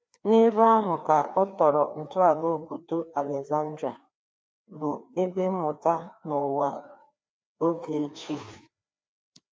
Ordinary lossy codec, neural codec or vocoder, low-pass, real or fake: none; codec, 16 kHz, 2 kbps, FreqCodec, larger model; none; fake